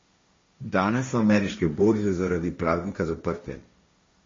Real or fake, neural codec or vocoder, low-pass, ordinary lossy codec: fake; codec, 16 kHz, 1.1 kbps, Voila-Tokenizer; 7.2 kHz; MP3, 32 kbps